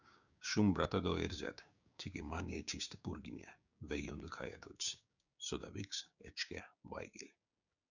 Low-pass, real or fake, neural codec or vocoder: 7.2 kHz; fake; codec, 16 kHz, 6 kbps, DAC